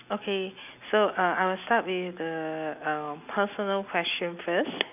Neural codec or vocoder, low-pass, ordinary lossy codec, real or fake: none; 3.6 kHz; none; real